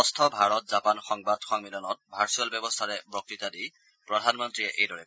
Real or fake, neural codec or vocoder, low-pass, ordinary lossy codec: real; none; none; none